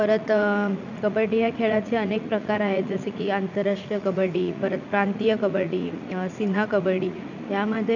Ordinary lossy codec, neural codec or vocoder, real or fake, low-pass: none; vocoder, 44.1 kHz, 80 mel bands, Vocos; fake; 7.2 kHz